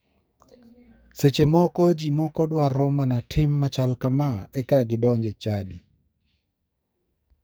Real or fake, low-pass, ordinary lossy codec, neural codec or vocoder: fake; none; none; codec, 44.1 kHz, 2.6 kbps, SNAC